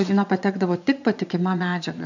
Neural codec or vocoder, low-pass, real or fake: vocoder, 22.05 kHz, 80 mel bands, Vocos; 7.2 kHz; fake